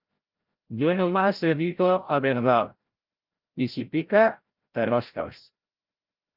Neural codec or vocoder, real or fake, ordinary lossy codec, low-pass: codec, 16 kHz, 0.5 kbps, FreqCodec, larger model; fake; Opus, 32 kbps; 5.4 kHz